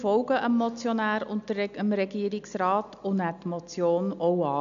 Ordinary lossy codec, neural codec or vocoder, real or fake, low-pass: none; none; real; 7.2 kHz